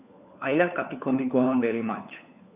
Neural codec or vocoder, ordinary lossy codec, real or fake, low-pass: codec, 16 kHz, 4 kbps, FunCodec, trained on LibriTTS, 50 frames a second; Opus, 64 kbps; fake; 3.6 kHz